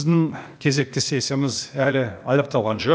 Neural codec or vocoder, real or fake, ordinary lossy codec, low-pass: codec, 16 kHz, 0.8 kbps, ZipCodec; fake; none; none